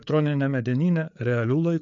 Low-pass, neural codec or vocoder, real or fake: 7.2 kHz; codec, 16 kHz, 4 kbps, FreqCodec, larger model; fake